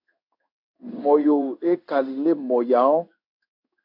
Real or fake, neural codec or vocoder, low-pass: fake; codec, 16 kHz in and 24 kHz out, 1 kbps, XY-Tokenizer; 5.4 kHz